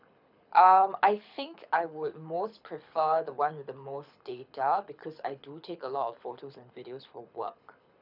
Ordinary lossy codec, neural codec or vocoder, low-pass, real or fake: none; codec, 24 kHz, 6 kbps, HILCodec; 5.4 kHz; fake